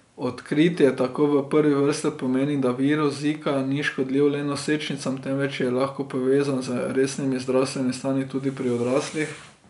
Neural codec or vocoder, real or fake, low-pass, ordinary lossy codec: none; real; 10.8 kHz; none